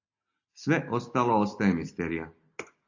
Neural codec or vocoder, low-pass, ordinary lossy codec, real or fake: none; 7.2 kHz; AAC, 48 kbps; real